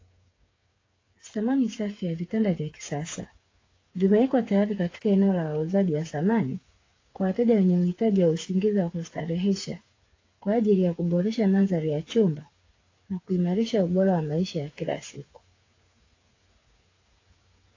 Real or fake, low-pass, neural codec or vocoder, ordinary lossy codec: fake; 7.2 kHz; codec, 16 kHz, 8 kbps, FreqCodec, smaller model; AAC, 32 kbps